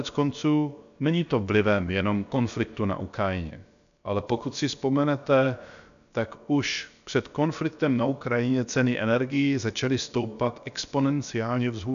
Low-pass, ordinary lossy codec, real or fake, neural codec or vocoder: 7.2 kHz; MP3, 96 kbps; fake; codec, 16 kHz, about 1 kbps, DyCAST, with the encoder's durations